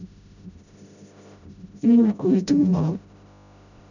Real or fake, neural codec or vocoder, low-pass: fake; codec, 16 kHz, 0.5 kbps, FreqCodec, smaller model; 7.2 kHz